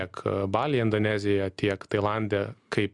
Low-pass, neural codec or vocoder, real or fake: 10.8 kHz; none; real